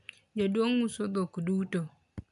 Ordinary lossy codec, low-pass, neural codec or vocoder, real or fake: MP3, 96 kbps; 10.8 kHz; none; real